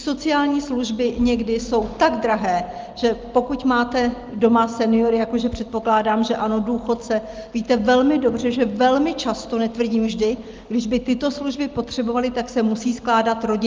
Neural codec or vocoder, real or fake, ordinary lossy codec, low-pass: none; real; Opus, 32 kbps; 7.2 kHz